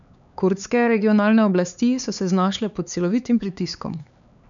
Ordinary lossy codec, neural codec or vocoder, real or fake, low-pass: none; codec, 16 kHz, 4 kbps, X-Codec, HuBERT features, trained on LibriSpeech; fake; 7.2 kHz